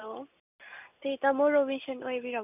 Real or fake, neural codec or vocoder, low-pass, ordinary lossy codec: real; none; 3.6 kHz; none